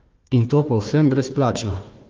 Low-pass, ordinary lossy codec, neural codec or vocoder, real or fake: 7.2 kHz; Opus, 32 kbps; codec, 16 kHz, 1 kbps, FunCodec, trained on Chinese and English, 50 frames a second; fake